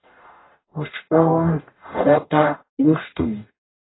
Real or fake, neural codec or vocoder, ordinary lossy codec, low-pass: fake; codec, 44.1 kHz, 0.9 kbps, DAC; AAC, 16 kbps; 7.2 kHz